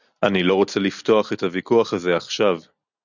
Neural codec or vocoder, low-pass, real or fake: none; 7.2 kHz; real